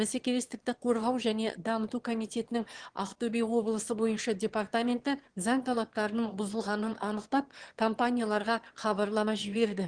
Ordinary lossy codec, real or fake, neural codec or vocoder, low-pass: Opus, 16 kbps; fake; autoencoder, 22.05 kHz, a latent of 192 numbers a frame, VITS, trained on one speaker; 9.9 kHz